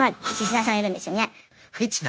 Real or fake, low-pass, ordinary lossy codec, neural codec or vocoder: fake; none; none; codec, 16 kHz, 0.9 kbps, LongCat-Audio-Codec